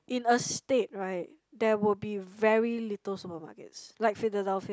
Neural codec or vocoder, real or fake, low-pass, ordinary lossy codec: none; real; none; none